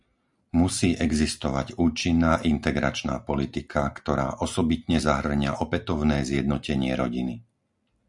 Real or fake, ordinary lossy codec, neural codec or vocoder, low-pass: real; MP3, 96 kbps; none; 10.8 kHz